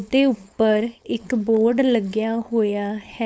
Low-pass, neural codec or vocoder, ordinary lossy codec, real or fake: none; codec, 16 kHz, 4.8 kbps, FACodec; none; fake